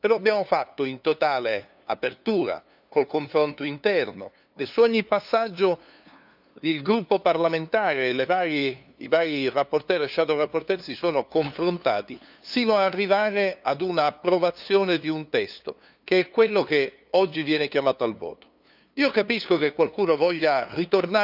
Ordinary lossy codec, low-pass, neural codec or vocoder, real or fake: none; 5.4 kHz; codec, 16 kHz, 2 kbps, FunCodec, trained on LibriTTS, 25 frames a second; fake